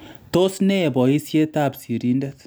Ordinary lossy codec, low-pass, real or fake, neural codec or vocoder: none; none; real; none